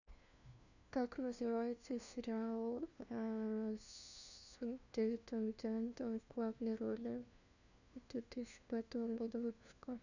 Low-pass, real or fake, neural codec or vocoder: 7.2 kHz; fake; codec, 16 kHz, 1 kbps, FunCodec, trained on LibriTTS, 50 frames a second